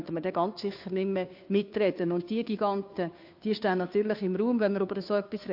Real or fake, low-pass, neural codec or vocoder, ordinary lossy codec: fake; 5.4 kHz; codec, 16 kHz, 2 kbps, FunCodec, trained on Chinese and English, 25 frames a second; AAC, 48 kbps